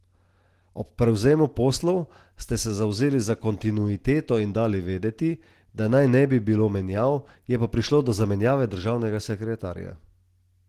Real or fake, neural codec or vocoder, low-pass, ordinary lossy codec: real; none; 14.4 kHz; Opus, 16 kbps